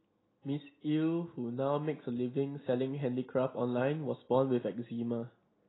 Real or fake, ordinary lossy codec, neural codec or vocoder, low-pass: real; AAC, 16 kbps; none; 7.2 kHz